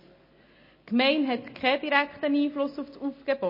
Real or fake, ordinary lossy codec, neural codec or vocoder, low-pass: real; MP3, 24 kbps; none; 5.4 kHz